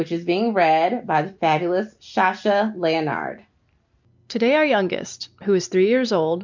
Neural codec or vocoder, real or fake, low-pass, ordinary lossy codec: none; real; 7.2 kHz; MP3, 64 kbps